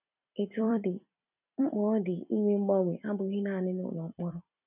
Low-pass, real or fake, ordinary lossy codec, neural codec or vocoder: 3.6 kHz; fake; none; vocoder, 44.1 kHz, 128 mel bands every 256 samples, BigVGAN v2